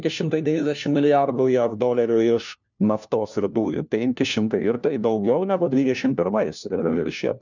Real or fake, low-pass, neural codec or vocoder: fake; 7.2 kHz; codec, 16 kHz, 1 kbps, FunCodec, trained on LibriTTS, 50 frames a second